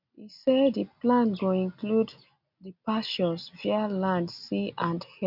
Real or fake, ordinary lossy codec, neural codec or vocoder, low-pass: real; none; none; 5.4 kHz